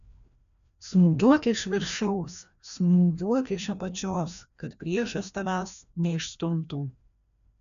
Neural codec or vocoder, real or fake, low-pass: codec, 16 kHz, 1 kbps, FreqCodec, larger model; fake; 7.2 kHz